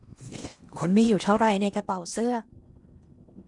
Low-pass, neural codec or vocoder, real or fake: 10.8 kHz; codec, 16 kHz in and 24 kHz out, 0.8 kbps, FocalCodec, streaming, 65536 codes; fake